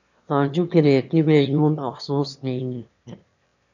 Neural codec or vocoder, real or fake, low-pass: autoencoder, 22.05 kHz, a latent of 192 numbers a frame, VITS, trained on one speaker; fake; 7.2 kHz